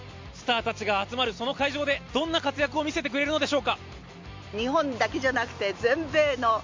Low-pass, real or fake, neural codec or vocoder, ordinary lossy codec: 7.2 kHz; real; none; MP3, 48 kbps